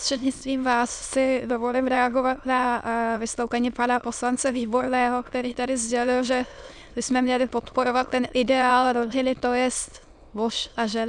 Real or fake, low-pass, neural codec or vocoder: fake; 9.9 kHz; autoencoder, 22.05 kHz, a latent of 192 numbers a frame, VITS, trained on many speakers